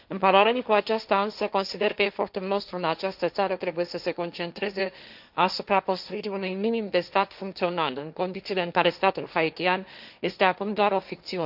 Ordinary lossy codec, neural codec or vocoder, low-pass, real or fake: none; codec, 16 kHz, 1.1 kbps, Voila-Tokenizer; 5.4 kHz; fake